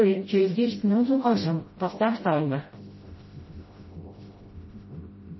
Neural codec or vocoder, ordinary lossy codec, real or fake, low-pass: codec, 16 kHz, 0.5 kbps, FreqCodec, smaller model; MP3, 24 kbps; fake; 7.2 kHz